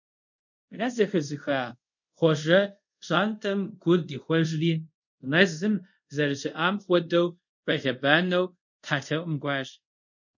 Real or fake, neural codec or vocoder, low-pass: fake; codec, 24 kHz, 0.5 kbps, DualCodec; 7.2 kHz